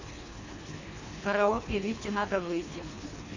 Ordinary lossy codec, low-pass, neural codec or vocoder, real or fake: AAC, 48 kbps; 7.2 kHz; codec, 24 kHz, 3 kbps, HILCodec; fake